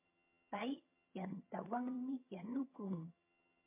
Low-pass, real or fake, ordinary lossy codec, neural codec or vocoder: 3.6 kHz; fake; MP3, 24 kbps; vocoder, 22.05 kHz, 80 mel bands, HiFi-GAN